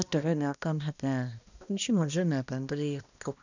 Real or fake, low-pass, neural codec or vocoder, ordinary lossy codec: fake; 7.2 kHz; codec, 16 kHz, 1 kbps, X-Codec, HuBERT features, trained on balanced general audio; none